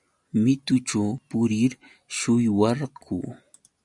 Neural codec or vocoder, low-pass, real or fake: none; 10.8 kHz; real